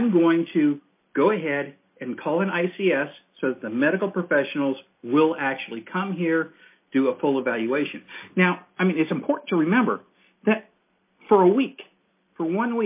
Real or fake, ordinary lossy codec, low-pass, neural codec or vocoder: real; MP3, 24 kbps; 3.6 kHz; none